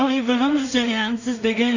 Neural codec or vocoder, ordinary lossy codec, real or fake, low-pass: codec, 16 kHz in and 24 kHz out, 0.4 kbps, LongCat-Audio-Codec, two codebook decoder; none; fake; 7.2 kHz